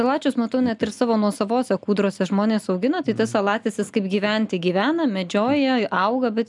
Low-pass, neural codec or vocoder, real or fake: 10.8 kHz; none; real